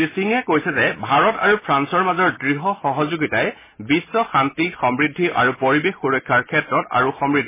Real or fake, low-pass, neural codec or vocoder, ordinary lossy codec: real; 3.6 kHz; none; MP3, 16 kbps